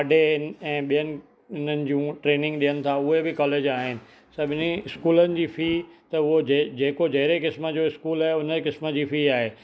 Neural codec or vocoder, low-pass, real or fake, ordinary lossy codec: none; none; real; none